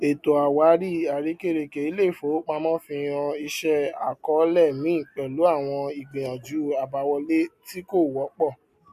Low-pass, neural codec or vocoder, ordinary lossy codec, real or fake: 14.4 kHz; none; MP3, 64 kbps; real